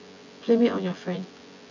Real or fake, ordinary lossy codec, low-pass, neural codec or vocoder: fake; none; 7.2 kHz; vocoder, 24 kHz, 100 mel bands, Vocos